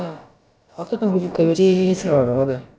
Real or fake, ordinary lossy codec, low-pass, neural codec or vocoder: fake; none; none; codec, 16 kHz, about 1 kbps, DyCAST, with the encoder's durations